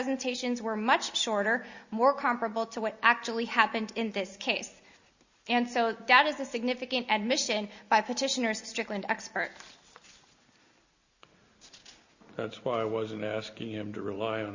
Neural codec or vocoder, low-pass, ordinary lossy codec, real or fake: none; 7.2 kHz; Opus, 64 kbps; real